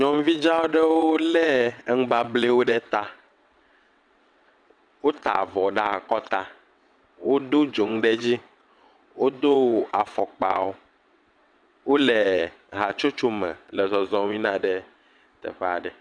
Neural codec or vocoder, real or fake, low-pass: vocoder, 22.05 kHz, 80 mel bands, WaveNeXt; fake; 9.9 kHz